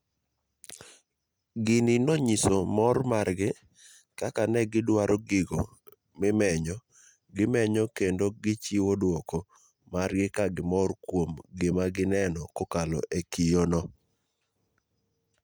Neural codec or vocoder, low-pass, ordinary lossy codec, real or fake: none; none; none; real